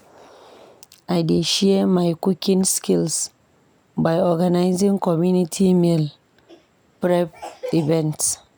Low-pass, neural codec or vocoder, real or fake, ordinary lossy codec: none; none; real; none